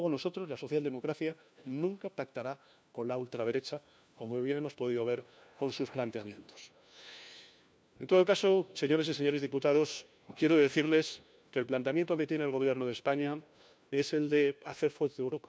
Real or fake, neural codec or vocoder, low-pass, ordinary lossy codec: fake; codec, 16 kHz, 1 kbps, FunCodec, trained on LibriTTS, 50 frames a second; none; none